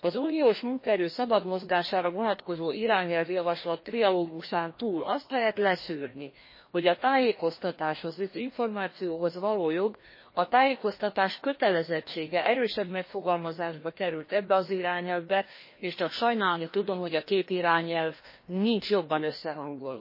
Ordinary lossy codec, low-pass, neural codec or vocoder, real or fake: MP3, 24 kbps; 5.4 kHz; codec, 16 kHz, 1 kbps, FreqCodec, larger model; fake